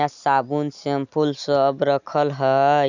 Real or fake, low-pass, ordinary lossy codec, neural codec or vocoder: real; 7.2 kHz; none; none